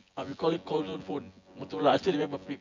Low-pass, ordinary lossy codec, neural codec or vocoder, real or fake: 7.2 kHz; MP3, 64 kbps; vocoder, 24 kHz, 100 mel bands, Vocos; fake